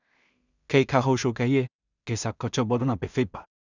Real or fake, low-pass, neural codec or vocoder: fake; 7.2 kHz; codec, 16 kHz in and 24 kHz out, 0.4 kbps, LongCat-Audio-Codec, two codebook decoder